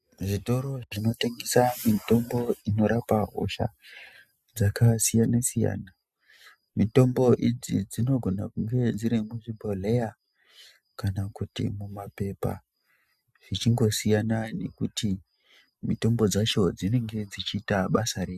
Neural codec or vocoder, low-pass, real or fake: none; 14.4 kHz; real